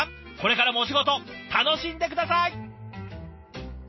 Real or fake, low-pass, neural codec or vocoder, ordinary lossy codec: real; 7.2 kHz; none; MP3, 24 kbps